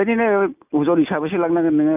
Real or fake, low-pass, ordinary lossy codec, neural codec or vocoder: real; 3.6 kHz; none; none